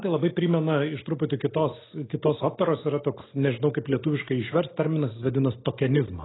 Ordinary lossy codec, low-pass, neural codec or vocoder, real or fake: AAC, 16 kbps; 7.2 kHz; none; real